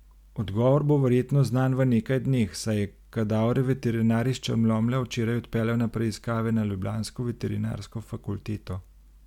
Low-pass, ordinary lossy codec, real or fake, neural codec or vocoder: 19.8 kHz; MP3, 96 kbps; real; none